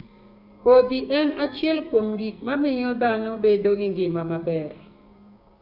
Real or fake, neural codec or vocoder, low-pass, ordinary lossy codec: fake; codec, 44.1 kHz, 2.6 kbps, SNAC; 5.4 kHz; none